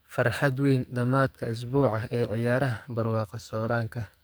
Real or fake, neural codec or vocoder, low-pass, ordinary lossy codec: fake; codec, 44.1 kHz, 2.6 kbps, SNAC; none; none